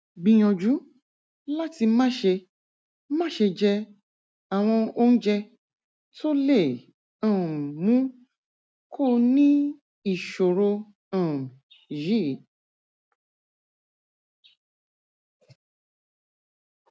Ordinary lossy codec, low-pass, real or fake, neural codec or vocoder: none; none; real; none